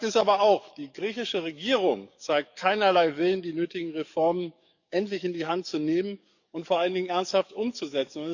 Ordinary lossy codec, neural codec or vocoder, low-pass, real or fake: none; codec, 44.1 kHz, 7.8 kbps, DAC; 7.2 kHz; fake